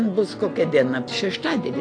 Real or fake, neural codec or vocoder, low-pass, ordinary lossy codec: real; none; 9.9 kHz; AAC, 48 kbps